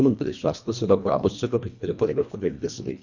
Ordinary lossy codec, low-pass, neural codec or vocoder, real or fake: none; 7.2 kHz; codec, 24 kHz, 1.5 kbps, HILCodec; fake